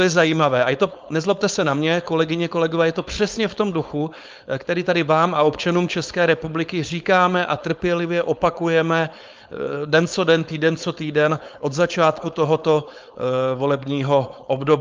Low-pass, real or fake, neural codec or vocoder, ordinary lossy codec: 7.2 kHz; fake; codec, 16 kHz, 4.8 kbps, FACodec; Opus, 24 kbps